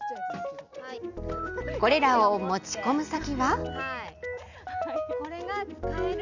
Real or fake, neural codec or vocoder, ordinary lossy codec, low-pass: real; none; none; 7.2 kHz